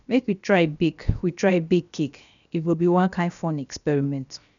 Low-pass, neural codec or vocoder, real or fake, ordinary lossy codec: 7.2 kHz; codec, 16 kHz, 0.7 kbps, FocalCodec; fake; none